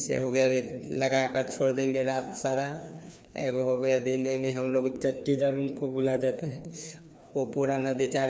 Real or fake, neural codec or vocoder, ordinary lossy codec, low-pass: fake; codec, 16 kHz, 2 kbps, FreqCodec, larger model; none; none